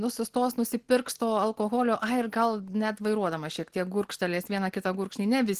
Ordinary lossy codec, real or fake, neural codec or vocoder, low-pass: Opus, 16 kbps; real; none; 14.4 kHz